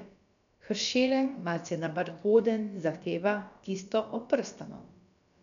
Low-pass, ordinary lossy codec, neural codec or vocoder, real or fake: 7.2 kHz; none; codec, 16 kHz, about 1 kbps, DyCAST, with the encoder's durations; fake